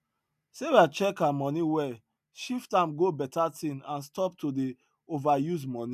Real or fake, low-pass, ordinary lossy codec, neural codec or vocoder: real; 14.4 kHz; none; none